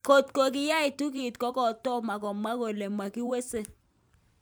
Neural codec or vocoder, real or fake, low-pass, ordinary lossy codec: vocoder, 44.1 kHz, 128 mel bands every 512 samples, BigVGAN v2; fake; none; none